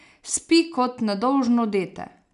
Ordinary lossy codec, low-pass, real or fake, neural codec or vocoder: none; 10.8 kHz; real; none